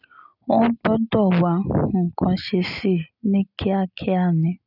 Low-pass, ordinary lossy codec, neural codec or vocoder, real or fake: 5.4 kHz; none; none; real